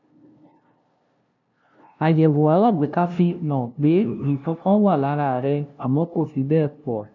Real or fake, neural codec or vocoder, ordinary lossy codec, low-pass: fake; codec, 16 kHz, 0.5 kbps, FunCodec, trained on LibriTTS, 25 frames a second; none; 7.2 kHz